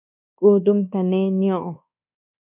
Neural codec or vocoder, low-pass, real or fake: codec, 24 kHz, 1.2 kbps, DualCodec; 3.6 kHz; fake